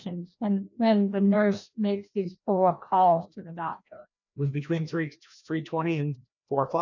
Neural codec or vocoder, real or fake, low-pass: codec, 16 kHz, 1 kbps, FreqCodec, larger model; fake; 7.2 kHz